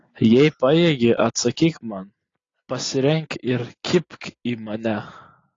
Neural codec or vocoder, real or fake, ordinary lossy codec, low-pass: none; real; AAC, 32 kbps; 7.2 kHz